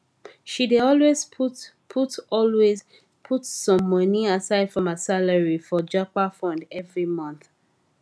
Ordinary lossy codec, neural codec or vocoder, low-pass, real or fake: none; none; none; real